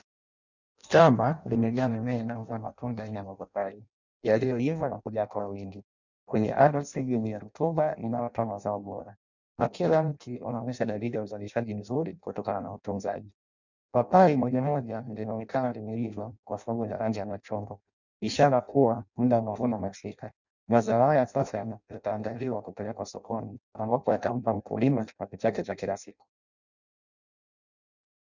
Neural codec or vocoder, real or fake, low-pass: codec, 16 kHz in and 24 kHz out, 0.6 kbps, FireRedTTS-2 codec; fake; 7.2 kHz